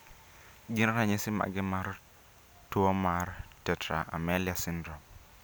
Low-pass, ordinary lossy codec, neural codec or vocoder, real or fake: none; none; none; real